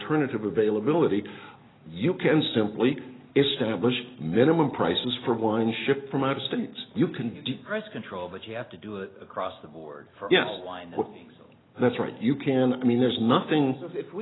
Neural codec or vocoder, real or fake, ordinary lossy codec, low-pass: none; real; AAC, 16 kbps; 7.2 kHz